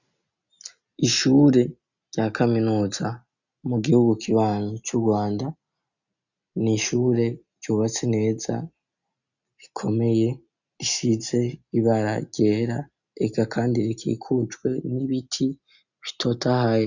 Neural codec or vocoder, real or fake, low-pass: none; real; 7.2 kHz